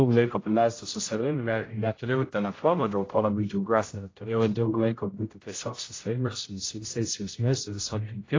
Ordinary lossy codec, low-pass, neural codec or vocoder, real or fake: AAC, 32 kbps; 7.2 kHz; codec, 16 kHz, 0.5 kbps, X-Codec, HuBERT features, trained on general audio; fake